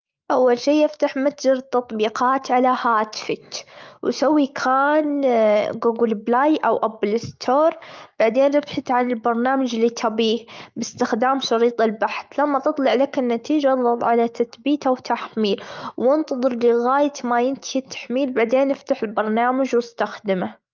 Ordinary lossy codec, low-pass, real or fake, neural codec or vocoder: Opus, 32 kbps; 7.2 kHz; fake; autoencoder, 48 kHz, 128 numbers a frame, DAC-VAE, trained on Japanese speech